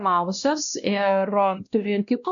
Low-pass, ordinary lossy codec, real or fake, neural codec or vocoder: 7.2 kHz; MP3, 96 kbps; fake; codec, 16 kHz, 1 kbps, X-Codec, WavLM features, trained on Multilingual LibriSpeech